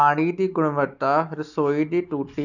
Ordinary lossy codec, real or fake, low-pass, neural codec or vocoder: none; real; 7.2 kHz; none